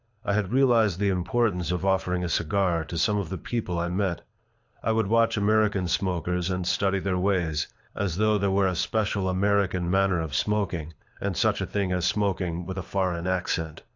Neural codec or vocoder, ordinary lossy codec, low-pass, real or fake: codec, 24 kHz, 6 kbps, HILCodec; AAC, 48 kbps; 7.2 kHz; fake